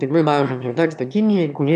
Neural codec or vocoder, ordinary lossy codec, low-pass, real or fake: autoencoder, 22.05 kHz, a latent of 192 numbers a frame, VITS, trained on one speaker; MP3, 96 kbps; 9.9 kHz; fake